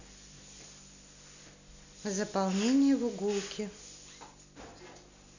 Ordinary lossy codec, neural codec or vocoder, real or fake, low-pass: none; none; real; 7.2 kHz